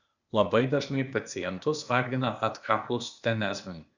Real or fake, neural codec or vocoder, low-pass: fake; codec, 16 kHz, 0.8 kbps, ZipCodec; 7.2 kHz